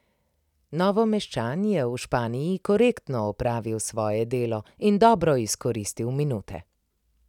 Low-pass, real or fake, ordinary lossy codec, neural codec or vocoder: 19.8 kHz; real; none; none